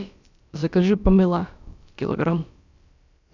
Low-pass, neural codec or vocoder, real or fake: 7.2 kHz; codec, 16 kHz, about 1 kbps, DyCAST, with the encoder's durations; fake